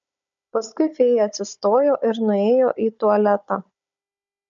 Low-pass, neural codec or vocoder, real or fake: 7.2 kHz; codec, 16 kHz, 16 kbps, FunCodec, trained on Chinese and English, 50 frames a second; fake